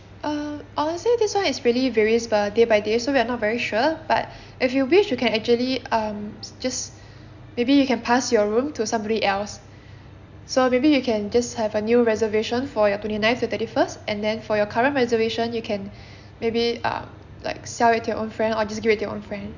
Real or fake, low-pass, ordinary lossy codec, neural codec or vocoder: real; 7.2 kHz; none; none